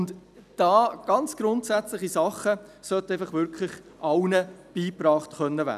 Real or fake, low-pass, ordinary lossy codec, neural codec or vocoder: real; 14.4 kHz; none; none